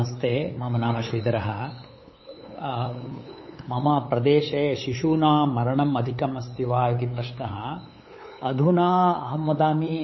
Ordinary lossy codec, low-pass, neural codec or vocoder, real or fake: MP3, 24 kbps; 7.2 kHz; codec, 16 kHz, 8 kbps, FunCodec, trained on LibriTTS, 25 frames a second; fake